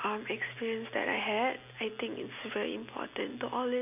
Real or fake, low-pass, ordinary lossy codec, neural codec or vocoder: real; 3.6 kHz; none; none